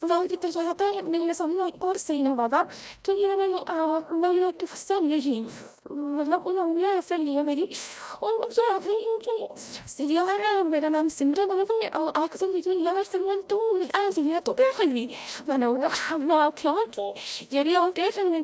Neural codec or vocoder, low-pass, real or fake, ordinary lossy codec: codec, 16 kHz, 0.5 kbps, FreqCodec, larger model; none; fake; none